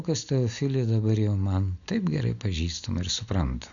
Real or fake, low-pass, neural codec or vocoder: real; 7.2 kHz; none